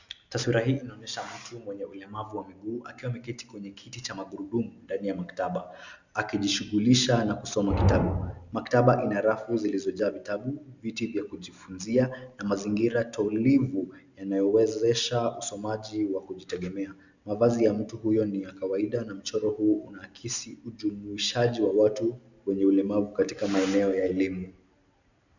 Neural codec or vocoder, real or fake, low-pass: none; real; 7.2 kHz